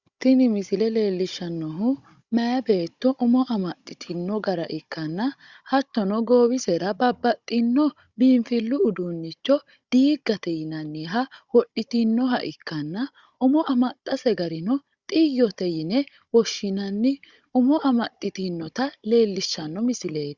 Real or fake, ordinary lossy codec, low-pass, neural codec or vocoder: fake; Opus, 64 kbps; 7.2 kHz; codec, 16 kHz, 16 kbps, FunCodec, trained on Chinese and English, 50 frames a second